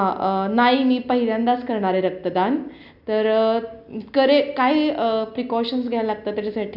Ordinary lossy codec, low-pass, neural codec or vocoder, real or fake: none; 5.4 kHz; none; real